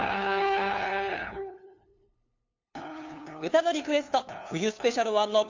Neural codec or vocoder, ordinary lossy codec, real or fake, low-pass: codec, 16 kHz, 2 kbps, FunCodec, trained on LibriTTS, 25 frames a second; AAC, 48 kbps; fake; 7.2 kHz